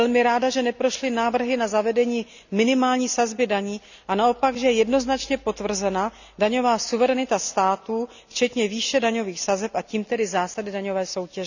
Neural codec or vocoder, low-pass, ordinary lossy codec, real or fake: none; 7.2 kHz; none; real